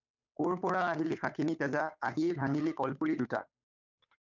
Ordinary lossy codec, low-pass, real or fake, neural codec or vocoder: MP3, 64 kbps; 7.2 kHz; fake; codec, 16 kHz, 8 kbps, FunCodec, trained on Chinese and English, 25 frames a second